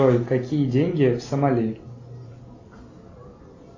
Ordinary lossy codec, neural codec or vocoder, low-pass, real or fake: AAC, 32 kbps; none; 7.2 kHz; real